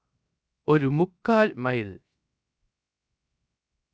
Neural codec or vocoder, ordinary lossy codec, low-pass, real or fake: codec, 16 kHz, 0.7 kbps, FocalCodec; none; none; fake